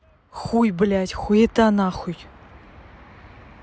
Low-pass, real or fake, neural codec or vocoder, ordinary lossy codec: none; real; none; none